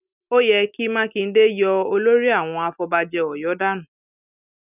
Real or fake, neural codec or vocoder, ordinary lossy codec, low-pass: real; none; none; 3.6 kHz